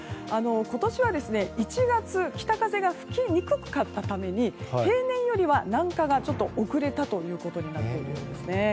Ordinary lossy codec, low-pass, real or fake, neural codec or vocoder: none; none; real; none